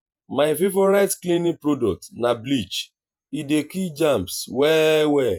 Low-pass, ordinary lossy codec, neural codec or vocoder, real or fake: 19.8 kHz; none; vocoder, 48 kHz, 128 mel bands, Vocos; fake